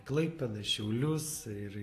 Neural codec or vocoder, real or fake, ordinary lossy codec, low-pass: none; real; AAC, 48 kbps; 14.4 kHz